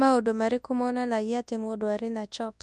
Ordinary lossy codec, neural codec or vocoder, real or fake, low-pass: none; codec, 24 kHz, 0.9 kbps, WavTokenizer, large speech release; fake; none